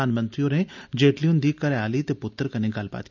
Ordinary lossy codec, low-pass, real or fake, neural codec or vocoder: none; 7.2 kHz; real; none